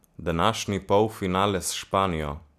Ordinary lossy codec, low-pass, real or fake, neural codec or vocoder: none; 14.4 kHz; real; none